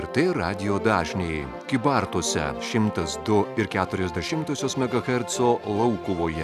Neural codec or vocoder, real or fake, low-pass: none; real; 14.4 kHz